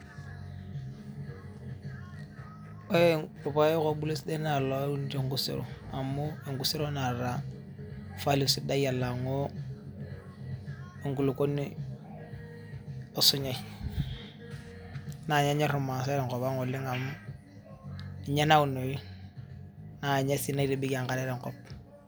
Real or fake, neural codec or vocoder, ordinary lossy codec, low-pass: real; none; none; none